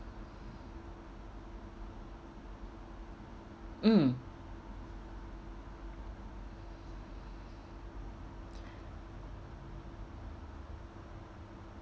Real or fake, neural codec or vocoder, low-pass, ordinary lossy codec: real; none; none; none